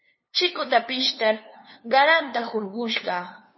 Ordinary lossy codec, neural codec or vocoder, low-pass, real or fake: MP3, 24 kbps; codec, 16 kHz, 2 kbps, FunCodec, trained on LibriTTS, 25 frames a second; 7.2 kHz; fake